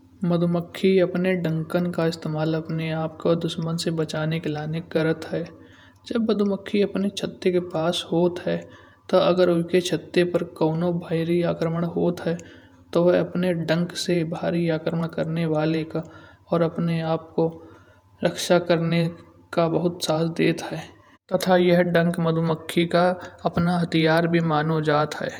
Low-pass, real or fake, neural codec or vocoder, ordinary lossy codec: 19.8 kHz; fake; vocoder, 48 kHz, 128 mel bands, Vocos; none